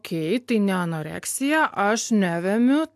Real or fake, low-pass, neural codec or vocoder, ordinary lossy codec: real; 14.4 kHz; none; AAC, 96 kbps